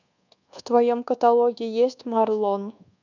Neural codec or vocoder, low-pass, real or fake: codec, 24 kHz, 1.2 kbps, DualCodec; 7.2 kHz; fake